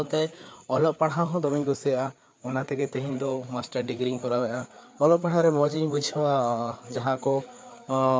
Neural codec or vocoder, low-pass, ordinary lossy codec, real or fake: codec, 16 kHz, 4 kbps, FreqCodec, larger model; none; none; fake